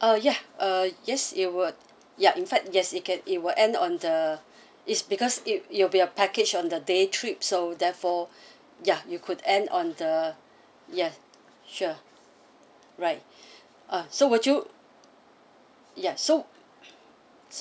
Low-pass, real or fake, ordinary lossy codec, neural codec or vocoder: none; real; none; none